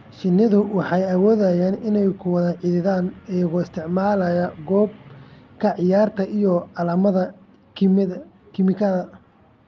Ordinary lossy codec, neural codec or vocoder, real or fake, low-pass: Opus, 32 kbps; none; real; 7.2 kHz